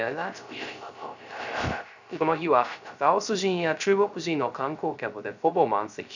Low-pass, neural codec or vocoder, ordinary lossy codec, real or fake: 7.2 kHz; codec, 16 kHz, 0.3 kbps, FocalCodec; none; fake